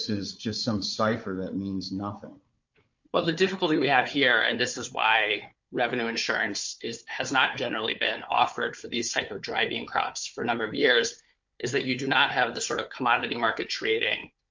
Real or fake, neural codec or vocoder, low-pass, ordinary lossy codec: fake; codec, 16 kHz, 4 kbps, FunCodec, trained on Chinese and English, 50 frames a second; 7.2 kHz; MP3, 48 kbps